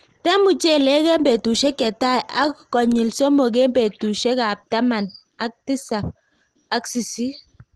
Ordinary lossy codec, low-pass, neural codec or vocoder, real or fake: Opus, 16 kbps; 10.8 kHz; none; real